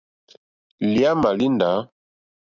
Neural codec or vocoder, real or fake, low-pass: none; real; 7.2 kHz